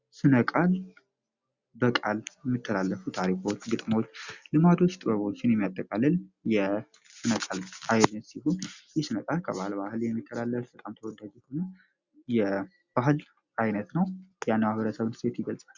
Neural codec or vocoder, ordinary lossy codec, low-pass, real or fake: none; Opus, 64 kbps; 7.2 kHz; real